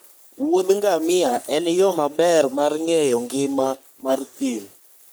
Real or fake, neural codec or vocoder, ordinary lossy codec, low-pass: fake; codec, 44.1 kHz, 3.4 kbps, Pupu-Codec; none; none